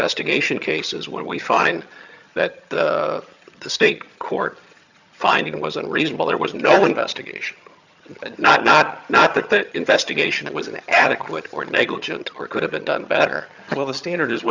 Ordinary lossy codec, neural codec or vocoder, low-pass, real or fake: Opus, 64 kbps; vocoder, 22.05 kHz, 80 mel bands, HiFi-GAN; 7.2 kHz; fake